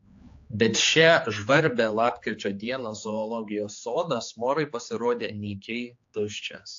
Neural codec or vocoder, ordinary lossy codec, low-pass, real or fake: codec, 16 kHz, 4 kbps, X-Codec, HuBERT features, trained on general audio; AAC, 48 kbps; 7.2 kHz; fake